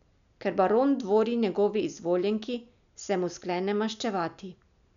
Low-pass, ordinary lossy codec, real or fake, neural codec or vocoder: 7.2 kHz; none; real; none